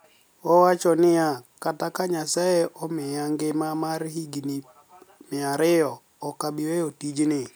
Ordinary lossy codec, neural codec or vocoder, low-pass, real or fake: none; none; none; real